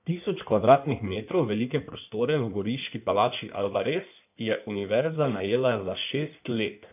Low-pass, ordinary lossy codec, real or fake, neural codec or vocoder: 3.6 kHz; none; fake; codec, 16 kHz in and 24 kHz out, 1.1 kbps, FireRedTTS-2 codec